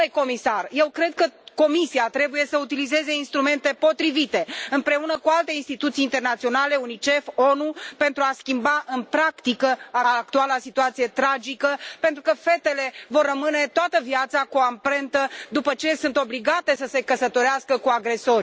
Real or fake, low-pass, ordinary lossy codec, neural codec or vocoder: real; none; none; none